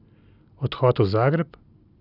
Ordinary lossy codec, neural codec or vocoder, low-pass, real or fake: none; none; 5.4 kHz; real